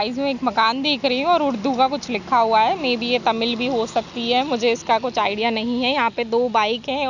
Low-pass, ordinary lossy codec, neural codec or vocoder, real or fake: 7.2 kHz; none; none; real